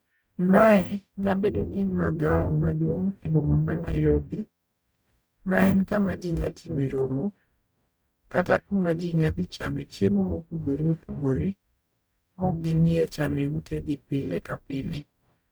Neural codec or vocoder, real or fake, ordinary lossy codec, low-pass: codec, 44.1 kHz, 0.9 kbps, DAC; fake; none; none